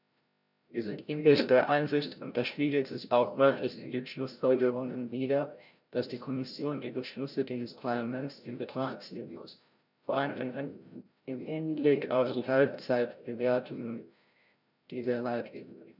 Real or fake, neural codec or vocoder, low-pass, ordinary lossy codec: fake; codec, 16 kHz, 0.5 kbps, FreqCodec, larger model; 5.4 kHz; none